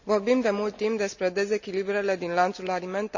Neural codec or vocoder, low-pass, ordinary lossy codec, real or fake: none; 7.2 kHz; none; real